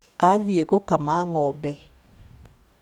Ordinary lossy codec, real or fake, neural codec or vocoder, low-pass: none; fake; codec, 44.1 kHz, 2.6 kbps, DAC; 19.8 kHz